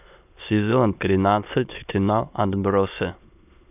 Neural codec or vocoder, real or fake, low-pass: autoencoder, 22.05 kHz, a latent of 192 numbers a frame, VITS, trained on many speakers; fake; 3.6 kHz